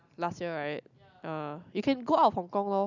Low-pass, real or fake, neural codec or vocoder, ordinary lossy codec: 7.2 kHz; real; none; none